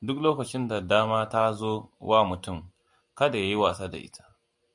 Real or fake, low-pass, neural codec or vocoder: real; 10.8 kHz; none